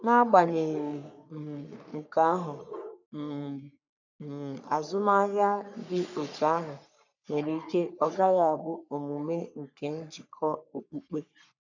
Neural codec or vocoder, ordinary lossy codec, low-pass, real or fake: codec, 44.1 kHz, 3.4 kbps, Pupu-Codec; none; 7.2 kHz; fake